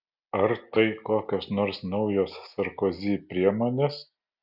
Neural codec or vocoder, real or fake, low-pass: none; real; 5.4 kHz